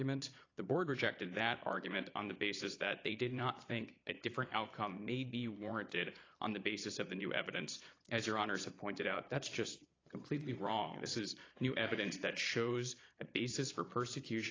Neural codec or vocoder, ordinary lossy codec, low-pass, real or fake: vocoder, 22.05 kHz, 80 mel bands, Vocos; AAC, 32 kbps; 7.2 kHz; fake